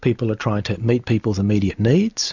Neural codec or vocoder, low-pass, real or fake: none; 7.2 kHz; real